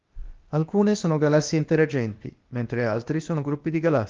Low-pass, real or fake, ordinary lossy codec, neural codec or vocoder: 7.2 kHz; fake; Opus, 32 kbps; codec, 16 kHz, 0.8 kbps, ZipCodec